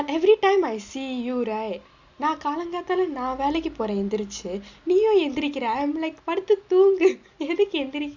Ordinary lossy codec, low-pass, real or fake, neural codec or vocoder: Opus, 64 kbps; 7.2 kHz; real; none